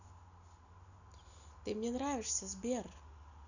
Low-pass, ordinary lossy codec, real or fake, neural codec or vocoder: 7.2 kHz; none; real; none